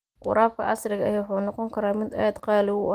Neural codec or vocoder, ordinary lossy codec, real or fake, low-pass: none; Opus, 24 kbps; real; 14.4 kHz